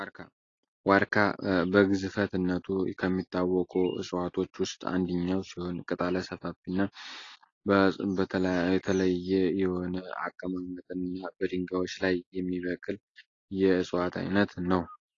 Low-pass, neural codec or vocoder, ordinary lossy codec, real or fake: 7.2 kHz; none; AAC, 32 kbps; real